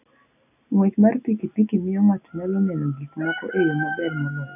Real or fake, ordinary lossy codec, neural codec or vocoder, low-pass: real; none; none; 3.6 kHz